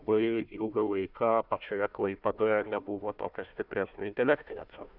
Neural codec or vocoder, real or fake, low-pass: codec, 16 kHz, 1 kbps, FunCodec, trained on Chinese and English, 50 frames a second; fake; 5.4 kHz